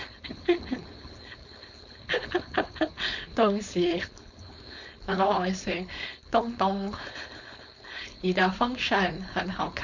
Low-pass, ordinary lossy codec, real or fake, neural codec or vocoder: 7.2 kHz; none; fake; codec, 16 kHz, 4.8 kbps, FACodec